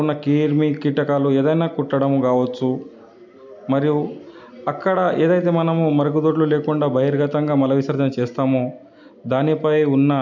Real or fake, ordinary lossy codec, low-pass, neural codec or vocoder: real; none; 7.2 kHz; none